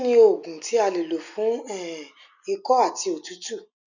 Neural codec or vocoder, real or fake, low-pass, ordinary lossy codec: none; real; 7.2 kHz; none